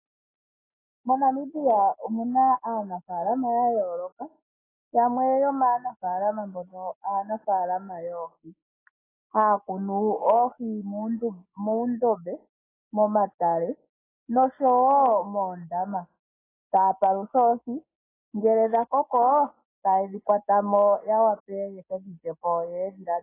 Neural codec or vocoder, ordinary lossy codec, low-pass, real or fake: none; AAC, 16 kbps; 3.6 kHz; real